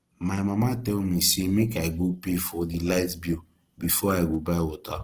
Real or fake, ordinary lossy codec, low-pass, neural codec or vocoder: real; Opus, 16 kbps; 14.4 kHz; none